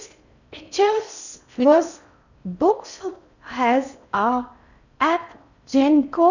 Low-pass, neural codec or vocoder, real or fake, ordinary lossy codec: 7.2 kHz; codec, 16 kHz in and 24 kHz out, 0.6 kbps, FocalCodec, streaming, 4096 codes; fake; none